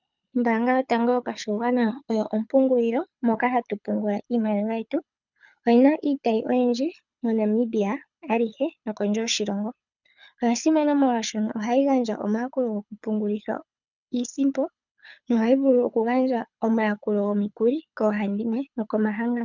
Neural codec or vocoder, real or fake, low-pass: codec, 24 kHz, 6 kbps, HILCodec; fake; 7.2 kHz